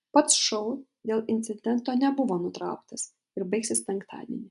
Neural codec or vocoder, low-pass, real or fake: none; 10.8 kHz; real